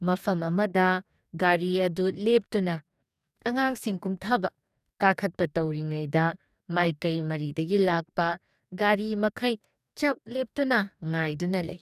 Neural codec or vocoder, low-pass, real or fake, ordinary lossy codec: codec, 44.1 kHz, 2.6 kbps, DAC; 14.4 kHz; fake; none